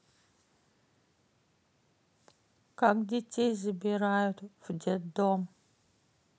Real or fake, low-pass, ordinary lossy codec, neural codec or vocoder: real; none; none; none